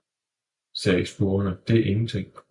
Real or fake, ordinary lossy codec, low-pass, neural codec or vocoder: real; MP3, 96 kbps; 10.8 kHz; none